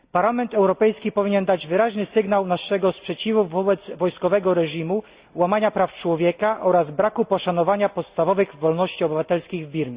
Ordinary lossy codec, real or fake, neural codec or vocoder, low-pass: Opus, 64 kbps; real; none; 3.6 kHz